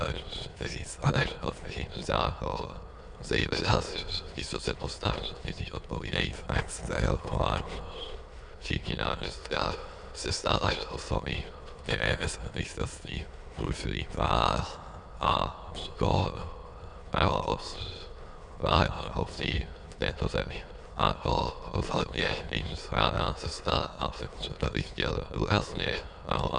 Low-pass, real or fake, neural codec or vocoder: 9.9 kHz; fake; autoencoder, 22.05 kHz, a latent of 192 numbers a frame, VITS, trained on many speakers